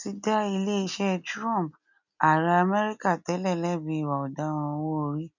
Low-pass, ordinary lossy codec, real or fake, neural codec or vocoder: 7.2 kHz; none; real; none